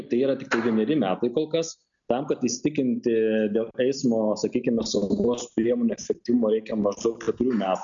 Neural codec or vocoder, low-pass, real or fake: none; 7.2 kHz; real